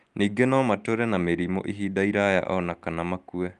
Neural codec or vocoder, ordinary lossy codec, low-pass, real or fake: none; Opus, 32 kbps; 10.8 kHz; real